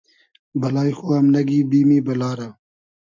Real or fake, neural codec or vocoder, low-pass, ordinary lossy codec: real; none; 7.2 kHz; MP3, 64 kbps